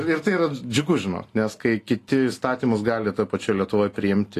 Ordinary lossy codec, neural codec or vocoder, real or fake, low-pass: AAC, 48 kbps; none; real; 14.4 kHz